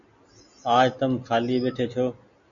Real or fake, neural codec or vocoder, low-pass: real; none; 7.2 kHz